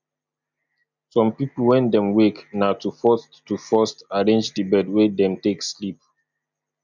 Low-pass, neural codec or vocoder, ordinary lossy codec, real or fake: 7.2 kHz; none; none; real